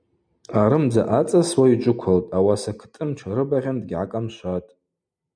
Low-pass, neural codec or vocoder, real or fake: 9.9 kHz; none; real